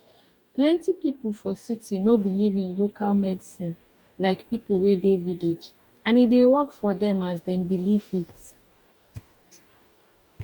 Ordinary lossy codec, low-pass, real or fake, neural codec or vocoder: none; 19.8 kHz; fake; codec, 44.1 kHz, 2.6 kbps, DAC